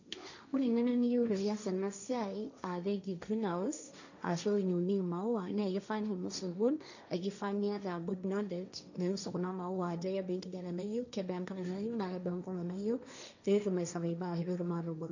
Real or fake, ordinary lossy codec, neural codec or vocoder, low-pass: fake; none; codec, 16 kHz, 1.1 kbps, Voila-Tokenizer; 7.2 kHz